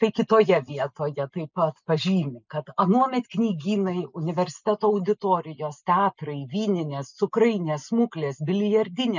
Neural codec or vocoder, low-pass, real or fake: none; 7.2 kHz; real